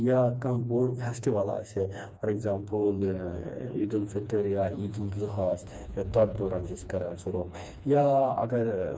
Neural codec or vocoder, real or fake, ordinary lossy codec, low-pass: codec, 16 kHz, 2 kbps, FreqCodec, smaller model; fake; none; none